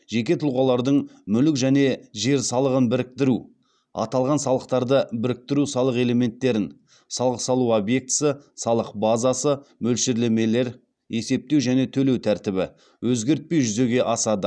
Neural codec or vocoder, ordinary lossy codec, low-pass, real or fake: none; none; 9.9 kHz; real